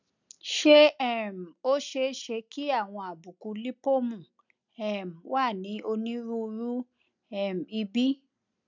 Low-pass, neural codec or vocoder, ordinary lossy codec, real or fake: 7.2 kHz; none; none; real